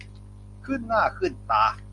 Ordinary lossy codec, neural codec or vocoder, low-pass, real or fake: Opus, 64 kbps; none; 10.8 kHz; real